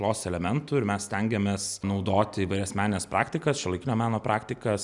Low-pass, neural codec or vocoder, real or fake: 10.8 kHz; none; real